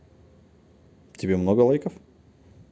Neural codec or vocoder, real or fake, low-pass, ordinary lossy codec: none; real; none; none